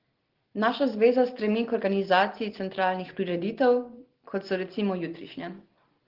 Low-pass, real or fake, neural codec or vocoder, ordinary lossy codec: 5.4 kHz; real; none; Opus, 16 kbps